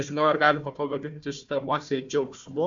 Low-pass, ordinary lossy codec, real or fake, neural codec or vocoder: 7.2 kHz; AAC, 64 kbps; fake; codec, 16 kHz, 1 kbps, FunCodec, trained on Chinese and English, 50 frames a second